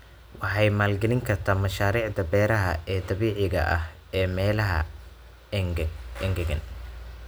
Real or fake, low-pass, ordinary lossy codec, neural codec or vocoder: real; none; none; none